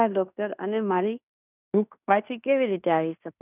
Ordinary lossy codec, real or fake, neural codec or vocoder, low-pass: none; fake; codec, 16 kHz in and 24 kHz out, 0.9 kbps, LongCat-Audio-Codec, fine tuned four codebook decoder; 3.6 kHz